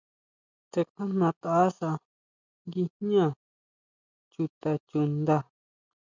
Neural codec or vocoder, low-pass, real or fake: none; 7.2 kHz; real